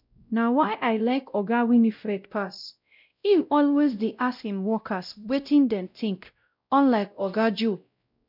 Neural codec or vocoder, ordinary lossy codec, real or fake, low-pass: codec, 16 kHz, 0.5 kbps, X-Codec, WavLM features, trained on Multilingual LibriSpeech; none; fake; 5.4 kHz